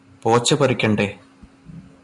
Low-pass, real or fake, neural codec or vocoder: 10.8 kHz; real; none